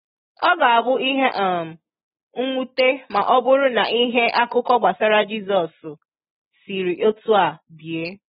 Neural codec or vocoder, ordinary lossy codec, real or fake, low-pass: none; AAC, 16 kbps; real; 7.2 kHz